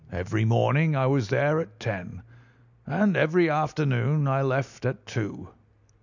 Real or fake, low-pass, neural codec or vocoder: real; 7.2 kHz; none